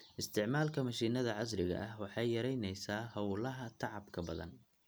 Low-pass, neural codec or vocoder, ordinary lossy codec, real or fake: none; none; none; real